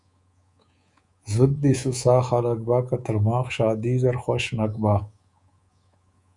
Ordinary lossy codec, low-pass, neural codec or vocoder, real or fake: Opus, 64 kbps; 10.8 kHz; codec, 24 kHz, 3.1 kbps, DualCodec; fake